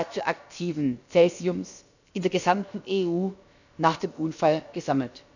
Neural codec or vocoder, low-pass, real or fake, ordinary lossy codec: codec, 16 kHz, about 1 kbps, DyCAST, with the encoder's durations; 7.2 kHz; fake; none